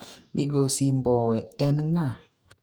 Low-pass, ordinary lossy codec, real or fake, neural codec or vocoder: none; none; fake; codec, 44.1 kHz, 2.6 kbps, DAC